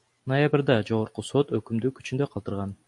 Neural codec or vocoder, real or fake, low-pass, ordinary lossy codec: none; real; 10.8 kHz; MP3, 64 kbps